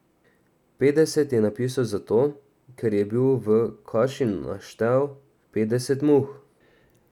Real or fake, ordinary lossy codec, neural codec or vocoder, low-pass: real; none; none; 19.8 kHz